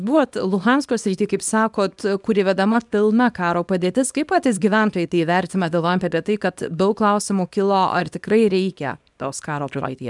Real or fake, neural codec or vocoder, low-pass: fake; codec, 24 kHz, 0.9 kbps, WavTokenizer, small release; 10.8 kHz